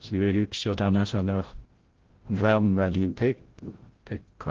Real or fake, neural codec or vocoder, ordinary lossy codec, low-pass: fake; codec, 16 kHz, 0.5 kbps, FreqCodec, larger model; Opus, 16 kbps; 7.2 kHz